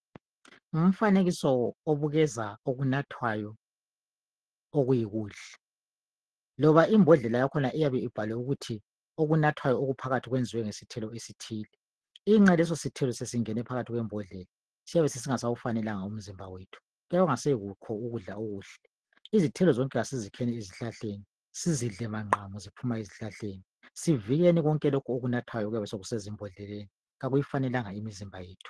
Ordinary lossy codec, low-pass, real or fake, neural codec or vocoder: Opus, 16 kbps; 10.8 kHz; real; none